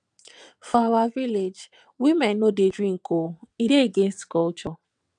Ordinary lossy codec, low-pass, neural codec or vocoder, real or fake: none; 9.9 kHz; vocoder, 22.05 kHz, 80 mel bands, WaveNeXt; fake